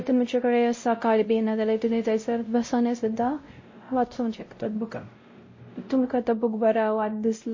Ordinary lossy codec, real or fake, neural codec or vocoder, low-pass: MP3, 32 kbps; fake; codec, 16 kHz, 0.5 kbps, X-Codec, WavLM features, trained on Multilingual LibriSpeech; 7.2 kHz